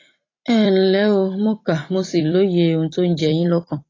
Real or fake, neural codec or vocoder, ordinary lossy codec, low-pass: fake; vocoder, 44.1 kHz, 128 mel bands every 256 samples, BigVGAN v2; AAC, 32 kbps; 7.2 kHz